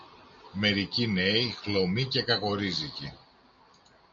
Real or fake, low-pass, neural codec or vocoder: real; 7.2 kHz; none